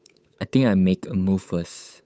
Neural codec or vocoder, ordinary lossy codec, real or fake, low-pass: codec, 16 kHz, 8 kbps, FunCodec, trained on Chinese and English, 25 frames a second; none; fake; none